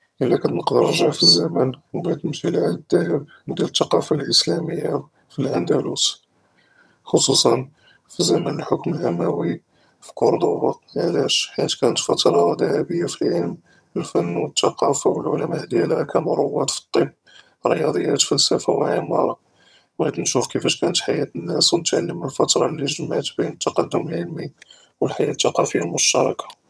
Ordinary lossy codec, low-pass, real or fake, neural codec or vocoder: none; none; fake; vocoder, 22.05 kHz, 80 mel bands, HiFi-GAN